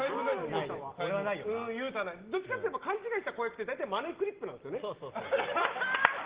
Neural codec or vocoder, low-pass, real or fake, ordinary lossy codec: none; 3.6 kHz; real; Opus, 16 kbps